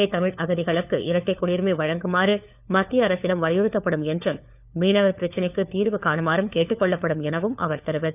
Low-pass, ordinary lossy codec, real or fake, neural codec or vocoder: 3.6 kHz; none; fake; codec, 16 kHz, 4 kbps, FunCodec, trained on LibriTTS, 50 frames a second